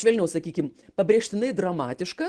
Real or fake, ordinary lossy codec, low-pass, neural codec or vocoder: real; Opus, 16 kbps; 10.8 kHz; none